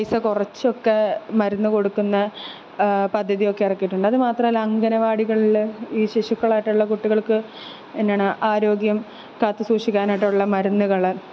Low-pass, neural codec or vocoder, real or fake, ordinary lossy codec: none; none; real; none